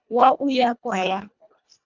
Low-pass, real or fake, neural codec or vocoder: 7.2 kHz; fake; codec, 24 kHz, 1.5 kbps, HILCodec